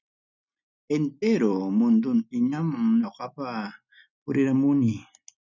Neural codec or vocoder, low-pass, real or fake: none; 7.2 kHz; real